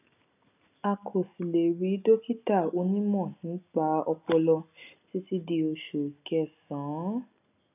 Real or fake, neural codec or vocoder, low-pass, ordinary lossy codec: real; none; 3.6 kHz; none